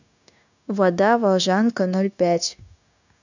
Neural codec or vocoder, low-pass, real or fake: codec, 16 kHz, 0.9 kbps, LongCat-Audio-Codec; 7.2 kHz; fake